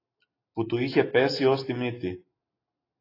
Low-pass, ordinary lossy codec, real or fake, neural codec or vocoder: 5.4 kHz; AAC, 24 kbps; real; none